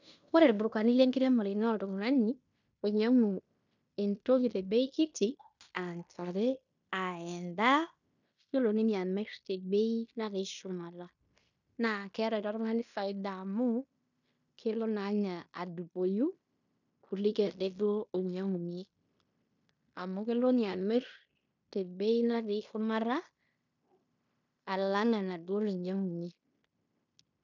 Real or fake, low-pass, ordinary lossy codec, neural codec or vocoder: fake; 7.2 kHz; none; codec, 16 kHz in and 24 kHz out, 0.9 kbps, LongCat-Audio-Codec, fine tuned four codebook decoder